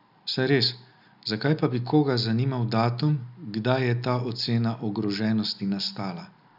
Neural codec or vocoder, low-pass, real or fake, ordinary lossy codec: none; 5.4 kHz; real; none